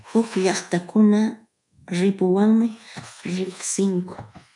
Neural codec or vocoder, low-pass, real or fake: codec, 24 kHz, 1.2 kbps, DualCodec; 10.8 kHz; fake